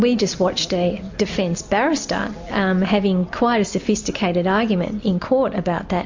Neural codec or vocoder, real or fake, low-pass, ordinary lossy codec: none; real; 7.2 kHz; MP3, 48 kbps